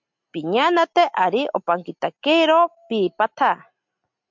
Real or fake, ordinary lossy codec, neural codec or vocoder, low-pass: real; MP3, 64 kbps; none; 7.2 kHz